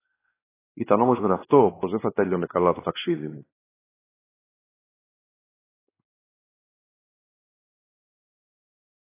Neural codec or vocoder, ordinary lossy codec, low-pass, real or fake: codec, 16 kHz, 2 kbps, X-Codec, WavLM features, trained on Multilingual LibriSpeech; AAC, 16 kbps; 3.6 kHz; fake